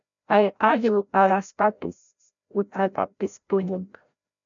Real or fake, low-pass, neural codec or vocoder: fake; 7.2 kHz; codec, 16 kHz, 0.5 kbps, FreqCodec, larger model